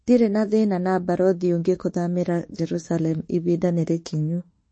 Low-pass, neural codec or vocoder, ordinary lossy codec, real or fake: 9.9 kHz; autoencoder, 48 kHz, 32 numbers a frame, DAC-VAE, trained on Japanese speech; MP3, 32 kbps; fake